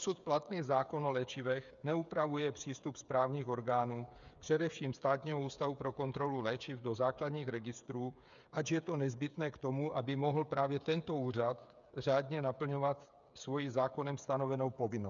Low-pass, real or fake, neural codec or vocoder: 7.2 kHz; fake; codec, 16 kHz, 8 kbps, FreqCodec, smaller model